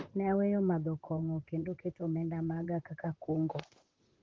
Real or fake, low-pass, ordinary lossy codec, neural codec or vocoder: fake; 7.2 kHz; Opus, 16 kbps; vocoder, 44.1 kHz, 128 mel bands, Pupu-Vocoder